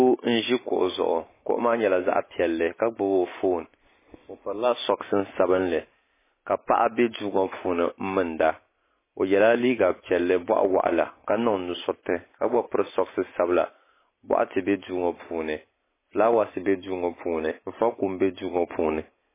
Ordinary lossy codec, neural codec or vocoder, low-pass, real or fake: MP3, 16 kbps; none; 3.6 kHz; real